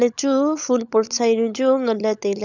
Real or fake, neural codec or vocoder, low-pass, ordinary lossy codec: fake; codec, 16 kHz, 16 kbps, FunCodec, trained on LibriTTS, 50 frames a second; 7.2 kHz; none